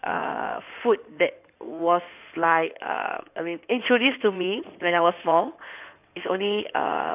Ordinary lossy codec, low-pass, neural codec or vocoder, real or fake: none; 3.6 kHz; codec, 16 kHz in and 24 kHz out, 2.2 kbps, FireRedTTS-2 codec; fake